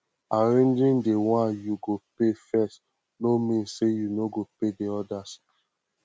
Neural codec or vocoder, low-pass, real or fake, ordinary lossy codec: none; none; real; none